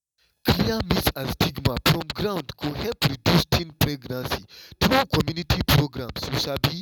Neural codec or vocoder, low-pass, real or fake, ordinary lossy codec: none; 19.8 kHz; real; none